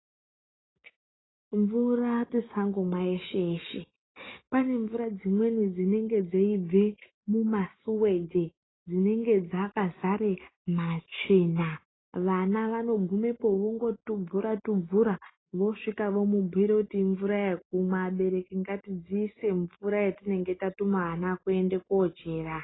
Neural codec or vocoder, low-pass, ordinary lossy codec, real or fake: none; 7.2 kHz; AAC, 16 kbps; real